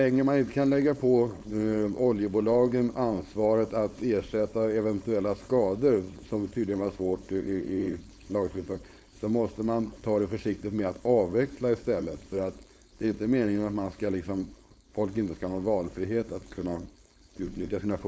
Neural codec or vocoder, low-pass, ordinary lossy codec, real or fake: codec, 16 kHz, 4.8 kbps, FACodec; none; none; fake